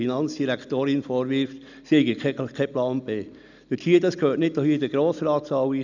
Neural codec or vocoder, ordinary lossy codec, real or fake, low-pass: none; none; real; 7.2 kHz